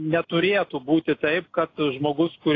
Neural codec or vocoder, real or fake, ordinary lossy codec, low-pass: none; real; AAC, 32 kbps; 7.2 kHz